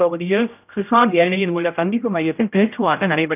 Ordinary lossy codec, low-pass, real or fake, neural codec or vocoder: none; 3.6 kHz; fake; codec, 16 kHz, 0.5 kbps, X-Codec, HuBERT features, trained on general audio